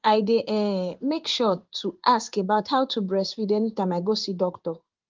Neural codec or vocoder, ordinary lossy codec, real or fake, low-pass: codec, 16 kHz in and 24 kHz out, 1 kbps, XY-Tokenizer; Opus, 32 kbps; fake; 7.2 kHz